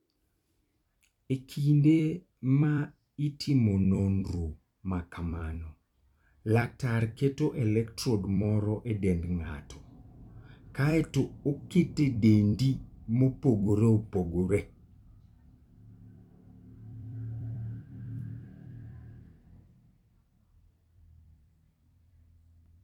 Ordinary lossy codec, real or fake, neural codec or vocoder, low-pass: none; fake; vocoder, 44.1 kHz, 128 mel bands every 256 samples, BigVGAN v2; 19.8 kHz